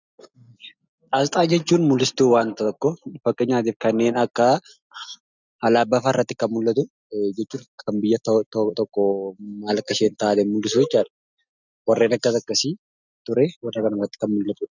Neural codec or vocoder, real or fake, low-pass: none; real; 7.2 kHz